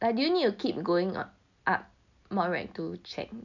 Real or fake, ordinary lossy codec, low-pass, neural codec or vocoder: real; none; 7.2 kHz; none